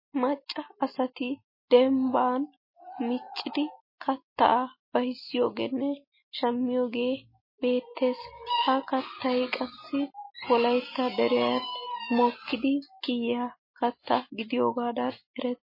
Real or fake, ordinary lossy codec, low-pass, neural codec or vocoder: real; MP3, 24 kbps; 5.4 kHz; none